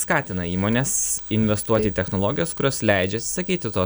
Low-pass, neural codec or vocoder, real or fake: 19.8 kHz; none; real